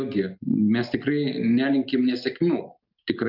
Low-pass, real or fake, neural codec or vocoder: 5.4 kHz; real; none